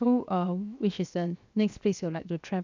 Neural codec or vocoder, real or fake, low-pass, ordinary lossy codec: codec, 16 kHz, 0.7 kbps, FocalCodec; fake; 7.2 kHz; MP3, 64 kbps